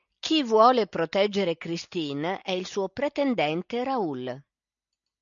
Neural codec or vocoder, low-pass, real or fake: none; 7.2 kHz; real